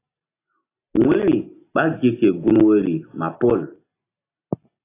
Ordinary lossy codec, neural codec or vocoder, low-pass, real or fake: AAC, 24 kbps; none; 3.6 kHz; real